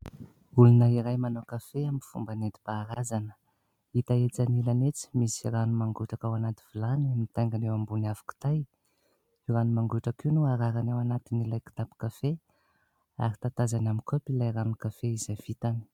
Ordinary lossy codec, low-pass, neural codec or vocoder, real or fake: MP3, 96 kbps; 19.8 kHz; none; real